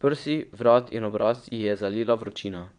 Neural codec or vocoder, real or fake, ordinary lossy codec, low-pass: vocoder, 22.05 kHz, 80 mel bands, Vocos; fake; none; 9.9 kHz